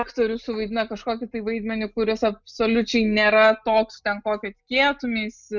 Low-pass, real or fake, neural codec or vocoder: 7.2 kHz; real; none